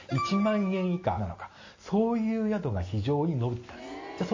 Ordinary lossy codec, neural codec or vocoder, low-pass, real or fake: MP3, 32 kbps; none; 7.2 kHz; real